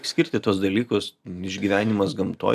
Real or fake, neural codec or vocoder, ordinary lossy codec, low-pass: real; none; AAC, 96 kbps; 14.4 kHz